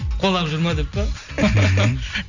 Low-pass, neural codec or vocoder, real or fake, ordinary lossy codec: 7.2 kHz; none; real; none